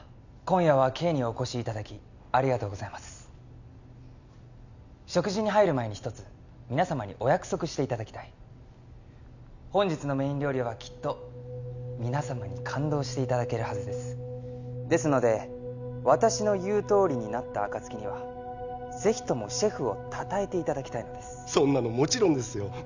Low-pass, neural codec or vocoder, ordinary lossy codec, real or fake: 7.2 kHz; none; none; real